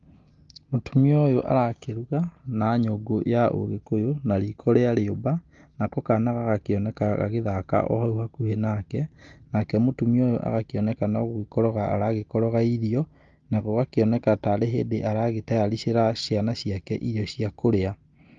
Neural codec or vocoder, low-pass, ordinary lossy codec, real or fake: none; 7.2 kHz; Opus, 32 kbps; real